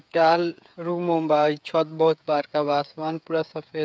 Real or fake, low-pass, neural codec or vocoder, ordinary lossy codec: fake; none; codec, 16 kHz, 8 kbps, FreqCodec, smaller model; none